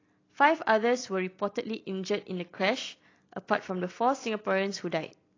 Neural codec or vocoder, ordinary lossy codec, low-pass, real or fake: none; AAC, 32 kbps; 7.2 kHz; real